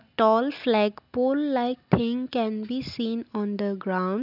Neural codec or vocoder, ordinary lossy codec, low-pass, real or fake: none; none; 5.4 kHz; real